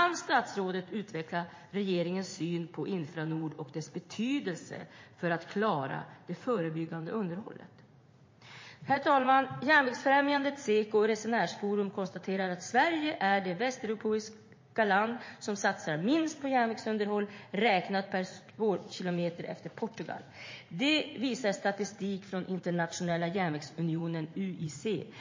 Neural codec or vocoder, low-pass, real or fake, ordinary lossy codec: vocoder, 44.1 kHz, 80 mel bands, Vocos; 7.2 kHz; fake; MP3, 32 kbps